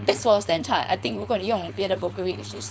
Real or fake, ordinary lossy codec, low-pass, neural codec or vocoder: fake; none; none; codec, 16 kHz, 4.8 kbps, FACodec